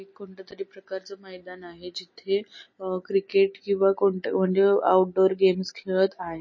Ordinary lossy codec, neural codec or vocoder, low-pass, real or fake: MP3, 32 kbps; none; 7.2 kHz; real